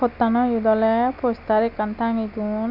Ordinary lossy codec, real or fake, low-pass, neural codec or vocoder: AAC, 48 kbps; real; 5.4 kHz; none